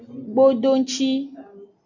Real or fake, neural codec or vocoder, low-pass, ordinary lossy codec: real; none; 7.2 kHz; AAC, 48 kbps